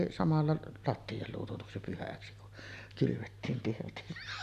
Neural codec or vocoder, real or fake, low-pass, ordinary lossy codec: none; real; 14.4 kHz; none